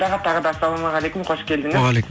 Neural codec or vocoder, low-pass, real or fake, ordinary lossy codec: none; none; real; none